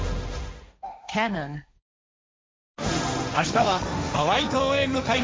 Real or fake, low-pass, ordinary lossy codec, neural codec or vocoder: fake; none; none; codec, 16 kHz, 1.1 kbps, Voila-Tokenizer